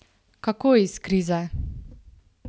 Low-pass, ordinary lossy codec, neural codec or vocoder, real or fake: none; none; none; real